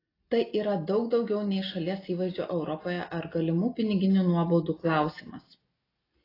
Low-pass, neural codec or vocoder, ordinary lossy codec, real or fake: 5.4 kHz; none; AAC, 24 kbps; real